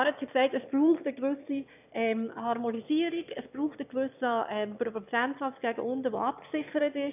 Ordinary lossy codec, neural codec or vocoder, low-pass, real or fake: none; autoencoder, 22.05 kHz, a latent of 192 numbers a frame, VITS, trained on one speaker; 3.6 kHz; fake